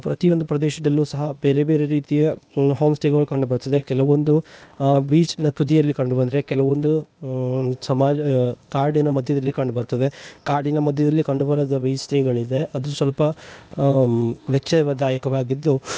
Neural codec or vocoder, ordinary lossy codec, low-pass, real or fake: codec, 16 kHz, 0.8 kbps, ZipCodec; none; none; fake